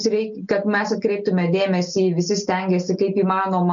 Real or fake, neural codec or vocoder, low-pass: real; none; 7.2 kHz